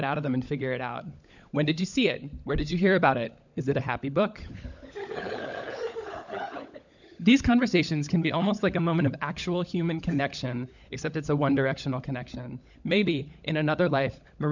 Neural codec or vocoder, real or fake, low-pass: codec, 16 kHz, 16 kbps, FunCodec, trained on LibriTTS, 50 frames a second; fake; 7.2 kHz